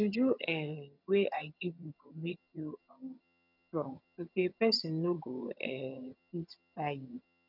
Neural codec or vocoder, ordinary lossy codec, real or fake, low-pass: vocoder, 22.05 kHz, 80 mel bands, HiFi-GAN; none; fake; 5.4 kHz